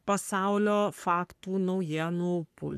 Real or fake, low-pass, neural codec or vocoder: fake; 14.4 kHz; codec, 44.1 kHz, 3.4 kbps, Pupu-Codec